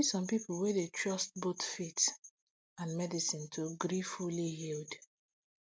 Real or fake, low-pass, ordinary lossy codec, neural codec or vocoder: real; none; none; none